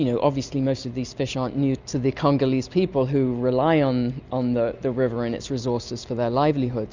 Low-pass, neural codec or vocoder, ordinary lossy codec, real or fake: 7.2 kHz; none; Opus, 64 kbps; real